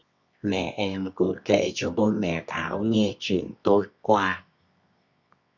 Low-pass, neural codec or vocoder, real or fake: 7.2 kHz; codec, 24 kHz, 0.9 kbps, WavTokenizer, medium music audio release; fake